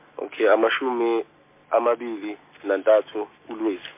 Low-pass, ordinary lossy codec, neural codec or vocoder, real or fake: 3.6 kHz; MP3, 24 kbps; none; real